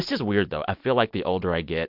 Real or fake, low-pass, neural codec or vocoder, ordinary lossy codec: real; 5.4 kHz; none; MP3, 48 kbps